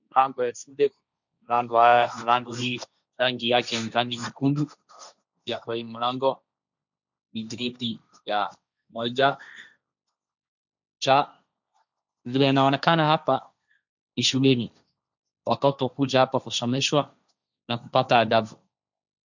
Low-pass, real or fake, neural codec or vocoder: 7.2 kHz; fake; codec, 16 kHz, 1.1 kbps, Voila-Tokenizer